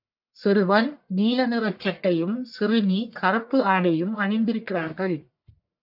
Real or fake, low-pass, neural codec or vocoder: fake; 5.4 kHz; codec, 44.1 kHz, 1.7 kbps, Pupu-Codec